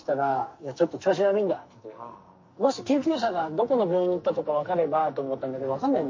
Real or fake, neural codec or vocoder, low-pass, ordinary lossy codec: fake; codec, 44.1 kHz, 2.6 kbps, SNAC; 7.2 kHz; MP3, 32 kbps